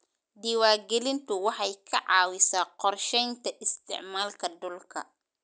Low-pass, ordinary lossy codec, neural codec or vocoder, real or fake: none; none; none; real